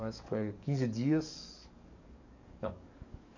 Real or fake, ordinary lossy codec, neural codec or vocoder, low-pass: fake; none; codec, 44.1 kHz, 7.8 kbps, DAC; 7.2 kHz